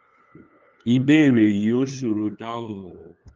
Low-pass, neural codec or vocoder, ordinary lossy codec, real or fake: 7.2 kHz; codec, 16 kHz, 2 kbps, FunCodec, trained on LibriTTS, 25 frames a second; Opus, 24 kbps; fake